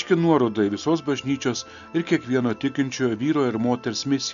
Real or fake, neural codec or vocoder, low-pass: real; none; 7.2 kHz